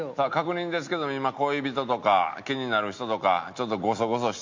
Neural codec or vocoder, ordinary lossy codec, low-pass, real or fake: none; MP3, 48 kbps; 7.2 kHz; real